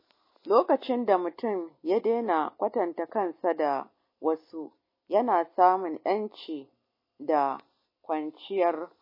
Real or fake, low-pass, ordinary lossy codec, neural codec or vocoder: real; 5.4 kHz; MP3, 24 kbps; none